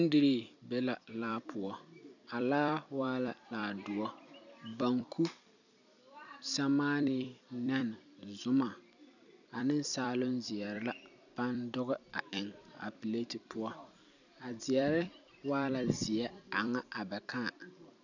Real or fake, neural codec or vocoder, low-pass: fake; vocoder, 44.1 kHz, 128 mel bands every 256 samples, BigVGAN v2; 7.2 kHz